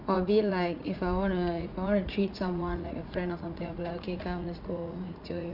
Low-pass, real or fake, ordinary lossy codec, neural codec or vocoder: 5.4 kHz; fake; none; vocoder, 44.1 kHz, 80 mel bands, Vocos